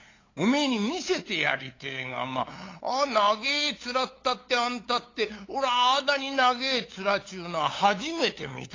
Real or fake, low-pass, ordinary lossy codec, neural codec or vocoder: fake; 7.2 kHz; AAC, 32 kbps; codec, 16 kHz, 8 kbps, FunCodec, trained on LibriTTS, 25 frames a second